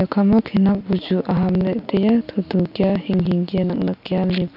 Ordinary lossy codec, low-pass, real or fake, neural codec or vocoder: none; 5.4 kHz; real; none